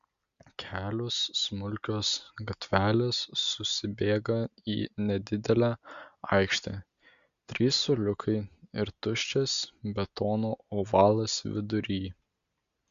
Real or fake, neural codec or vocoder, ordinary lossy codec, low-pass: real; none; Opus, 64 kbps; 7.2 kHz